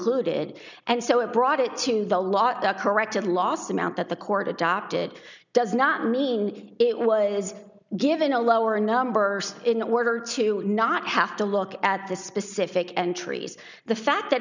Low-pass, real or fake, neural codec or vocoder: 7.2 kHz; real; none